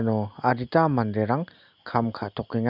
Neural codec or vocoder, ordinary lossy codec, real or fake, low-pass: none; none; real; 5.4 kHz